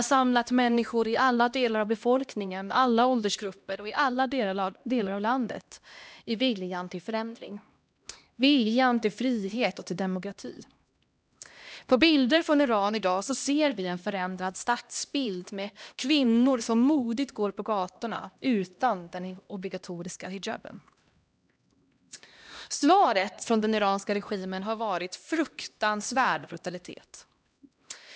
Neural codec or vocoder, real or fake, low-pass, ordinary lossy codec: codec, 16 kHz, 1 kbps, X-Codec, HuBERT features, trained on LibriSpeech; fake; none; none